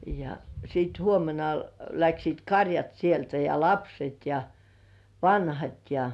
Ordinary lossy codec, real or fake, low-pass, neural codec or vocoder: none; real; none; none